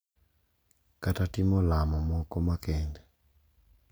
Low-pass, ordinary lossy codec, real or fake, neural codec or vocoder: none; none; real; none